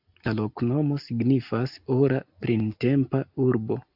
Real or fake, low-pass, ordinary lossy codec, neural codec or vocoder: real; 5.4 kHz; AAC, 48 kbps; none